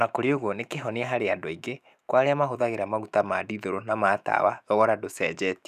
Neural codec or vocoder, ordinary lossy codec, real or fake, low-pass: autoencoder, 48 kHz, 128 numbers a frame, DAC-VAE, trained on Japanese speech; none; fake; 14.4 kHz